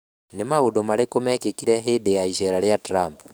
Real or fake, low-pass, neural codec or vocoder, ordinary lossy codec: fake; none; codec, 44.1 kHz, 7.8 kbps, DAC; none